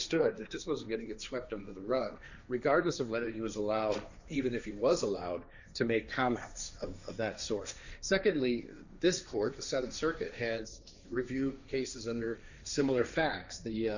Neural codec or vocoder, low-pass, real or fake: codec, 16 kHz, 1.1 kbps, Voila-Tokenizer; 7.2 kHz; fake